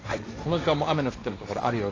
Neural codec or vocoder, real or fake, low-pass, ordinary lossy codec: codec, 16 kHz, 1.1 kbps, Voila-Tokenizer; fake; 7.2 kHz; AAC, 32 kbps